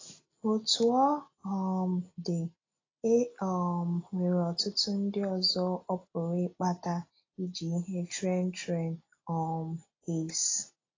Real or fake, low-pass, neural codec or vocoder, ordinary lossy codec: real; 7.2 kHz; none; AAC, 32 kbps